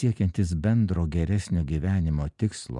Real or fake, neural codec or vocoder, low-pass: real; none; 10.8 kHz